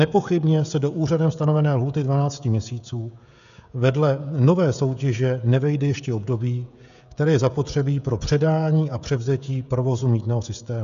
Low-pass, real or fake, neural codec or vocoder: 7.2 kHz; fake; codec, 16 kHz, 16 kbps, FreqCodec, smaller model